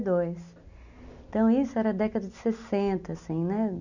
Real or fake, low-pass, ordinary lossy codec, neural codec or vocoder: real; 7.2 kHz; none; none